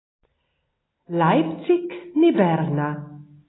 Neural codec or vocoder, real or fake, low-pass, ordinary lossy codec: none; real; 7.2 kHz; AAC, 16 kbps